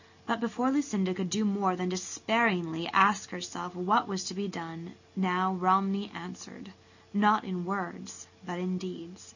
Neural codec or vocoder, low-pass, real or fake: none; 7.2 kHz; real